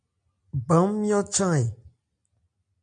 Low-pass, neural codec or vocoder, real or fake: 9.9 kHz; none; real